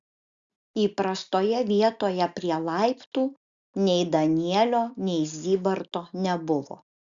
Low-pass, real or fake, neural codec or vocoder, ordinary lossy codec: 7.2 kHz; real; none; Opus, 64 kbps